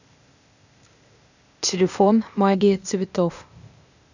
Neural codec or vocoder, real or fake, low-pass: codec, 16 kHz, 0.8 kbps, ZipCodec; fake; 7.2 kHz